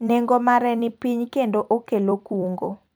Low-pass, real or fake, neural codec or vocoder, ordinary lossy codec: none; fake; vocoder, 44.1 kHz, 128 mel bands every 256 samples, BigVGAN v2; none